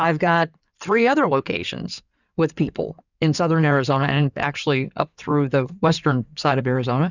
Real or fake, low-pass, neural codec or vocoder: fake; 7.2 kHz; codec, 16 kHz in and 24 kHz out, 2.2 kbps, FireRedTTS-2 codec